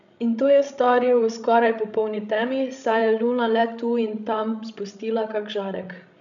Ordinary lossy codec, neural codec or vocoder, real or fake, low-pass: none; codec, 16 kHz, 16 kbps, FreqCodec, larger model; fake; 7.2 kHz